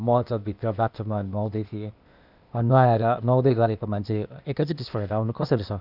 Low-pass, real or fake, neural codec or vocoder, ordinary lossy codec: 5.4 kHz; fake; codec, 16 kHz, 0.8 kbps, ZipCodec; none